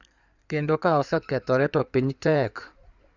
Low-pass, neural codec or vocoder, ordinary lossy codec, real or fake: 7.2 kHz; codec, 16 kHz in and 24 kHz out, 2.2 kbps, FireRedTTS-2 codec; none; fake